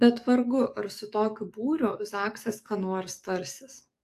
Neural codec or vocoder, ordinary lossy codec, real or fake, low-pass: codec, 44.1 kHz, 7.8 kbps, DAC; AAC, 64 kbps; fake; 14.4 kHz